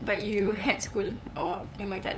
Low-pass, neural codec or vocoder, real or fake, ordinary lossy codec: none; codec, 16 kHz, 8 kbps, FunCodec, trained on LibriTTS, 25 frames a second; fake; none